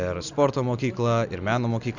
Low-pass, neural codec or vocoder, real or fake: 7.2 kHz; none; real